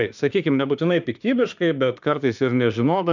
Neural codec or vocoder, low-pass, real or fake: autoencoder, 48 kHz, 32 numbers a frame, DAC-VAE, trained on Japanese speech; 7.2 kHz; fake